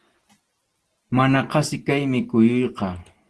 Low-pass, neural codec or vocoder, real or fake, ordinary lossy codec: 10.8 kHz; none; real; Opus, 16 kbps